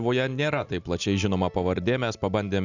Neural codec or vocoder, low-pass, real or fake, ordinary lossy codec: none; 7.2 kHz; real; Opus, 64 kbps